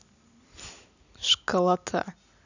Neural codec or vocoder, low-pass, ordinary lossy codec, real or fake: none; 7.2 kHz; none; real